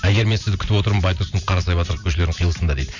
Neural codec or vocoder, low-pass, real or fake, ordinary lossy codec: vocoder, 44.1 kHz, 128 mel bands every 512 samples, BigVGAN v2; 7.2 kHz; fake; none